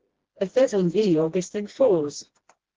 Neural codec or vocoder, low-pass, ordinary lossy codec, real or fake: codec, 16 kHz, 1 kbps, FreqCodec, smaller model; 7.2 kHz; Opus, 16 kbps; fake